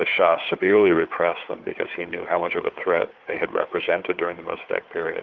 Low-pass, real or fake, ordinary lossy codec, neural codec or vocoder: 7.2 kHz; fake; Opus, 32 kbps; codec, 16 kHz, 4 kbps, FunCodec, trained on Chinese and English, 50 frames a second